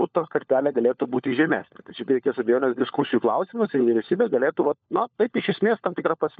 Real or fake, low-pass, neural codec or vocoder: fake; 7.2 kHz; codec, 16 kHz, 4 kbps, FunCodec, trained on LibriTTS, 50 frames a second